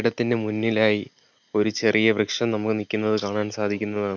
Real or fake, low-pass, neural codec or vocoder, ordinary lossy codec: real; 7.2 kHz; none; none